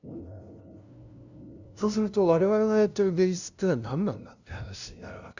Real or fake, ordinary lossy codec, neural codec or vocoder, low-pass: fake; none; codec, 16 kHz, 0.5 kbps, FunCodec, trained on LibriTTS, 25 frames a second; 7.2 kHz